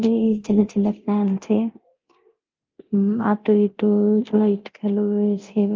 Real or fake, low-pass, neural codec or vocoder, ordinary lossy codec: fake; 7.2 kHz; codec, 24 kHz, 0.9 kbps, DualCodec; Opus, 24 kbps